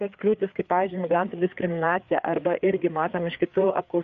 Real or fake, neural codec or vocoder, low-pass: fake; codec, 16 kHz, 4 kbps, FreqCodec, larger model; 7.2 kHz